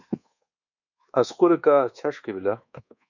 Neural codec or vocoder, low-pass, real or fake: codec, 24 kHz, 1.2 kbps, DualCodec; 7.2 kHz; fake